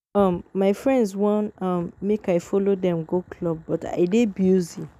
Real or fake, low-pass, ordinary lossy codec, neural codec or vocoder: real; 14.4 kHz; none; none